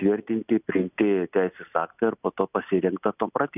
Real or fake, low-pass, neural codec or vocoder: real; 3.6 kHz; none